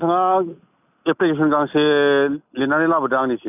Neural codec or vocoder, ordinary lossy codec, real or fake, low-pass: none; none; real; 3.6 kHz